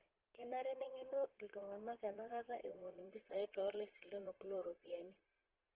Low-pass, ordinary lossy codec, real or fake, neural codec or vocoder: 3.6 kHz; Opus, 16 kbps; fake; vocoder, 22.05 kHz, 80 mel bands, Vocos